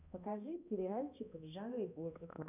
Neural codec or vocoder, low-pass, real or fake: codec, 16 kHz, 1 kbps, X-Codec, HuBERT features, trained on balanced general audio; 3.6 kHz; fake